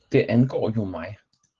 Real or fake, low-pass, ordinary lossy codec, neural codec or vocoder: real; 7.2 kHz; Opus, 16 kbps; none